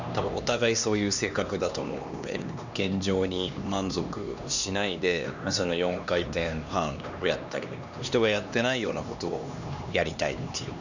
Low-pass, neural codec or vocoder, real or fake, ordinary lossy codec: 7.2 kHz; codec, 16 kHz, 2 kbps, X-Codec, HuBERT features, trained on LibriSpeech; fake; none